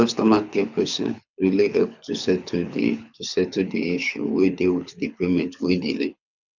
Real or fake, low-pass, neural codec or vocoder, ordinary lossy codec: fake; 7.2 kHz; codec, 24 kHz, 6 kbps, HILCodec; none